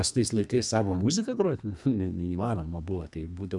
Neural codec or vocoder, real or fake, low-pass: codec, 32 kHz, 1.9 kbps, SNAC; fake; 10.8 kHz